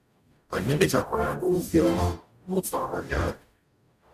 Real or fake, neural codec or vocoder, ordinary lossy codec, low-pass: fake; codec, 44.1 kHz, 0.9 kbps, DAC; none; 14.4 kHz